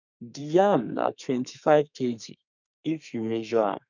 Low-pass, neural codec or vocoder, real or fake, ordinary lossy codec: 7.2 kHz; codec, 32 kHz, 1.9 kbps, SNAC; fake; none